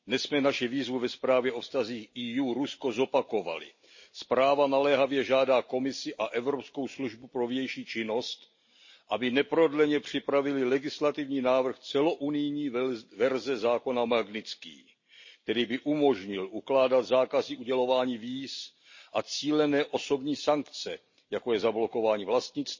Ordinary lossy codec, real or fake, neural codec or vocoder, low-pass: MP3, 32 kbps; real; none; 7.2 kHz